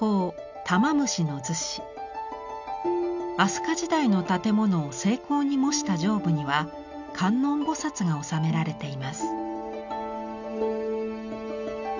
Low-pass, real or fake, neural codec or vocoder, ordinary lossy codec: 7.2 kHz; real; none; none